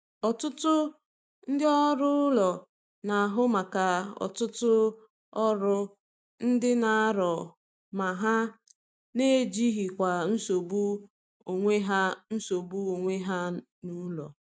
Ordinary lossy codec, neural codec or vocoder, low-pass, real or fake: none; none; none; real